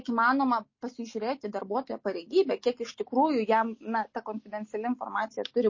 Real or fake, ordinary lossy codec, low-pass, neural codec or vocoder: real; MP3, 32 kbps; 7.2 kHz; none